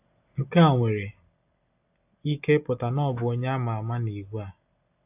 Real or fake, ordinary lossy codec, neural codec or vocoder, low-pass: real; AAC, 32 kbps; none; 3.6 kHz